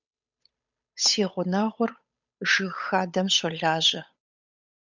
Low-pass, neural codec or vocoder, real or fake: 7.2 kHz; codec, 16 kHz, 8 kbps, FunCodec, trained on Chinese and English, 25 frames a second; fake